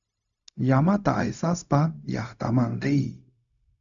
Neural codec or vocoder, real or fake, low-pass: codec, 16 kHz, 0.4 kbps, LongCat-Audio-Codec; fake; 7.2 kHz